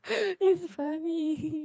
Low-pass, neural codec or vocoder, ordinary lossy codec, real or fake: none; codec, 16 kHz, 2 kbps, FreqCodec, larger model; none; fake